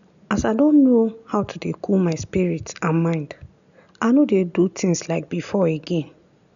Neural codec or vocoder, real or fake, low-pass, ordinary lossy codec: none; real; 7.2 kHz; none